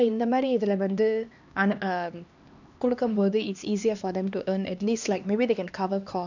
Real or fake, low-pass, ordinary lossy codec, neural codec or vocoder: fake; 7.2 kHz; none; codec, 16 kHz, 2 kbps, X-Codec, HuBERT features, trained on LibriSpeech